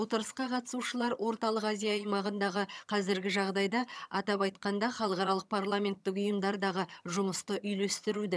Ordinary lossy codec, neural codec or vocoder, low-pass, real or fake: none; vocoder, 22.05 kHz, 80 mel bands, HiFi-GAN; none; fake